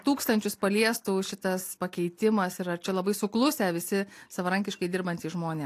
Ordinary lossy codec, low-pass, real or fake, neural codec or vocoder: AAC, 64 kbps; 14.4 kHz; real; none